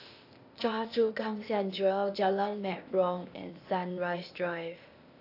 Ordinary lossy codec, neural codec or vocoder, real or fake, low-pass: AAC, 32 kbps; codec, 16 kHz, 0.8 kbps, ZipCodec; fake; 5.4 kHz